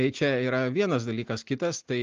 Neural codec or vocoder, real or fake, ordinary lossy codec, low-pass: none; real; Opus, 24 kbps; 7.2 kHz